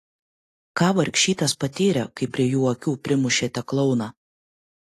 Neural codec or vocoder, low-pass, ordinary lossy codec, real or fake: none; 14.4 kHz; AAC, 48 kbps; real